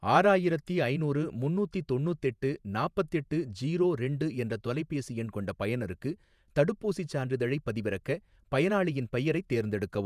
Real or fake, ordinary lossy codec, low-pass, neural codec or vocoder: fake; none; 14.4 kHz; vocoder, 48 kHz, 128 mel bands, Vocos